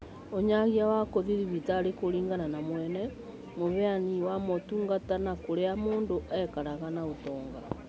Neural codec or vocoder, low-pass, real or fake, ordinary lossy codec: none; none; real; none